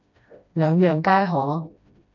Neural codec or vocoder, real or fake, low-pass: codec, 16 kHz, 1 kbps, FreqCodec, smaller model; fake; 7.2 kHz